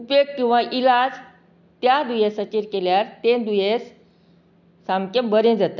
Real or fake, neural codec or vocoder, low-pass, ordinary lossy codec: real; none; 7.2 kHz; none